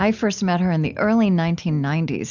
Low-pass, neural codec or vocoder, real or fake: 7.2 kHz; none; real